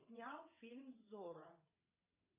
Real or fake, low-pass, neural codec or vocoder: fake; 3.6 kHz; vocoder, 22.05 kHz, 80 mel bands, WaveNeXt